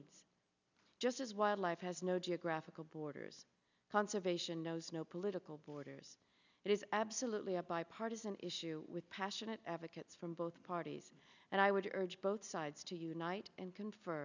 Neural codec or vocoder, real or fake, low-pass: none; real; 7.2 kHz